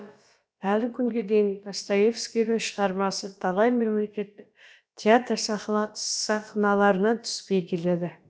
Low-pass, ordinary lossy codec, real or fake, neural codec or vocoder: none; none; fake; codec, 16 kHz, about 1 kbps, DyCAST, with the encoder's durations